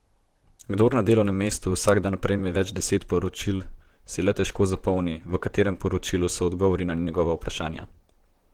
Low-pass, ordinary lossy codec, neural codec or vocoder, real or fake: 19.8 kHz; Opus, 16 kbps; vocoder, 44.1 kHz, 128 mel bands, Pupu-Vocoder; fake